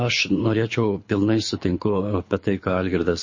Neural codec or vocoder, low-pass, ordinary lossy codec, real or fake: codec, 24 kHz, 6 kbps, HILCodec; 7.2 kHz; MP3, 32 kbps; fake